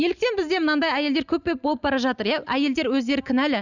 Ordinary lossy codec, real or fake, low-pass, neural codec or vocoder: none; real; 7.2 kHz; none